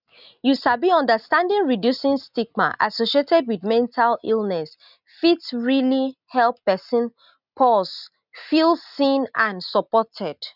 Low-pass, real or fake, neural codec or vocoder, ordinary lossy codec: 5.4 kHz; real; none; none